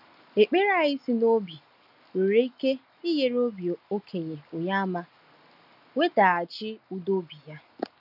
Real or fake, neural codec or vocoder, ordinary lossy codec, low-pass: real; none; none; 5.4 kHz